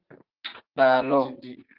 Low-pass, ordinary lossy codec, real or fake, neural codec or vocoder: 5.4 kHz; Opus, 24 kbps; fake; vocoder, 44.1 kHz, 80 mel bands, Vocos